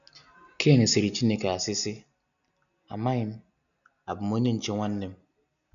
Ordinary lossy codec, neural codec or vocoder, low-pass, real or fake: none; none; 7.2 kHz; real